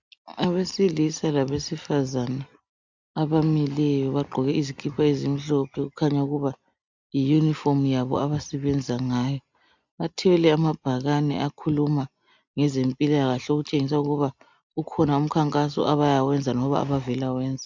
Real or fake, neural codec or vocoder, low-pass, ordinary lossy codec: real; none; 7.2 kHz; MP3, 64 kbps